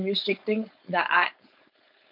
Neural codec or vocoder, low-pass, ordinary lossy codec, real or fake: codec, 16 kHz, 4.8 kbps, FACodec; 5.4 kHz; none; fake